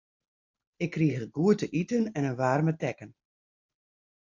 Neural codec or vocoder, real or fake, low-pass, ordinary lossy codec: none; real; 7.2 kHz; AAC, 48 kbps